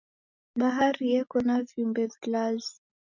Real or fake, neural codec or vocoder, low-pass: real; none; 7.2 kHz